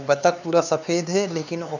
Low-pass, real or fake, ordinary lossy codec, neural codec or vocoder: 7.2 kHz; fake; none; codec, 16 kHz, 4 kbps, X-Codec, HuBERT features, trained on LibriSpeech